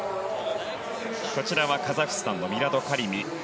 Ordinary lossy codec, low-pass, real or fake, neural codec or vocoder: none; none; real; none